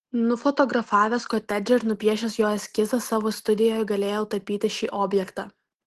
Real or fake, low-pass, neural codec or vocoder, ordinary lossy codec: real; 14.4 kHz; none; Opus, 16 kbps